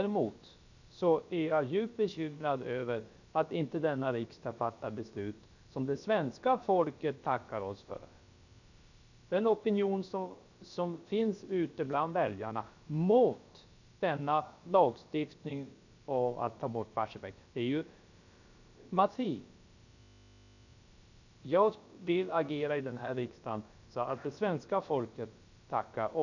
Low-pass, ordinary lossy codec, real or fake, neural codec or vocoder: 7.2 kHz; none; fake; codec, 16 kHz, about 1 kbps, DyCAST, with the encoder's durations